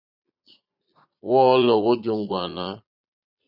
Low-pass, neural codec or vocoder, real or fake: 5.4 kHz; vocoder, 22.05 kHz, 80 mel bands, Vocos; fake